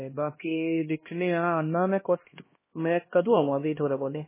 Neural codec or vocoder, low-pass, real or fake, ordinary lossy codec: codec, 16 kHz, 1 kbps, X-Codec, HuBERT features, trained on LibriSpeech; 3.6 kHz; fake; MP3, 16 kbps